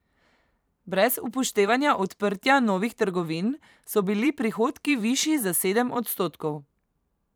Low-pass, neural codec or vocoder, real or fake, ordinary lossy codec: none; vocoder, 44.1 kHz, 128 mel bands every 512 samples, BigVGAN v2; fake; none